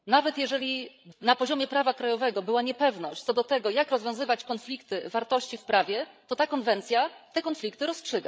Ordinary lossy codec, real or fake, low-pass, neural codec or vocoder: none; fake; none; codec, 16 kHz, 16 kbps, FreqCodec, larger model